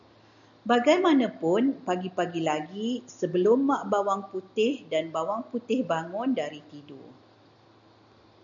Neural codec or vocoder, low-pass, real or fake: none; 7.2 kHz; real